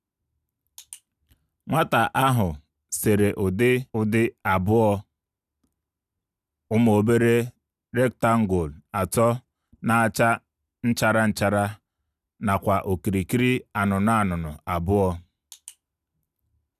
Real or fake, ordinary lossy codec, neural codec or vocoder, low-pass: real; none; none; 14.4 kHz